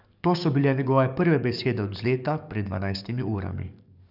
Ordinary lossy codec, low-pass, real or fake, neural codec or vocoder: none; 5.4 kHz; fake; codec, 44.1 kHz, 7.8 kbps, DAC